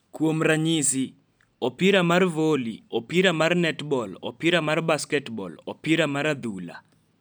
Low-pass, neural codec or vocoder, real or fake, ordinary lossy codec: none; none; real; none